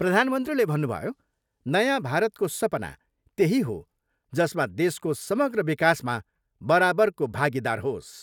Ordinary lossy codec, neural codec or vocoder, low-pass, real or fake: none; none; 19.8 kHz; real